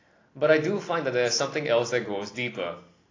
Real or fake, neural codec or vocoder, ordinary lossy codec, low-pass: real; none; AAC, 32 kbps; 7.2 kHz